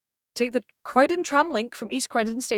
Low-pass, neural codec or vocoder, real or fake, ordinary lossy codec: 19.8 kHz; codec, 44.1 kHz, 2.6 kbps, DAC; fake; none